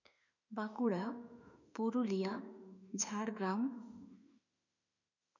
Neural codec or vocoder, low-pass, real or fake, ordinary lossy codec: autoencoder, 48 kHz, 32 numbers a frame, DAC-VAE, trained on Japanese speech; 7.2 kHz; fake; none